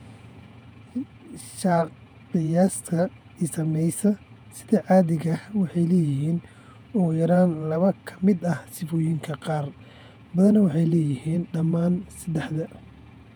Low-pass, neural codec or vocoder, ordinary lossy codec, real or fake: 19.8 kHz; vocoder, 48 kHz, 128 mel bands, Vocos; none; fake